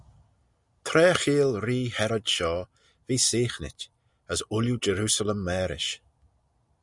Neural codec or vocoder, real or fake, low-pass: none; real; 10.8 kHz